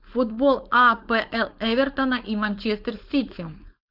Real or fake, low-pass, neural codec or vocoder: fake; 5.4 kHz; codec, 16 kHz, 4.8 kbps, FACodec